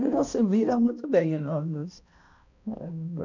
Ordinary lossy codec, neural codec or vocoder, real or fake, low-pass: none; codec, 16 kHz, 1 kbps, X-Codec, HuBERT features, trained on balanced general audio; fake; 7.2 kHz